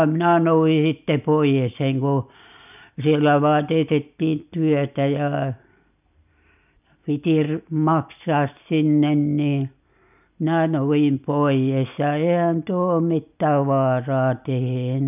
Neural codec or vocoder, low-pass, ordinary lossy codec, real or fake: none; 3.6 kHz; none; real